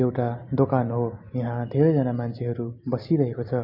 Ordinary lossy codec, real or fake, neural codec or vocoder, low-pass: MP3, 48 kbps; real; none; 5.4 kHz